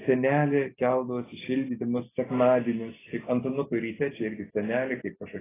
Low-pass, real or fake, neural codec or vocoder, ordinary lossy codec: 3.6 kHz; real; none; AAC, 16 kbps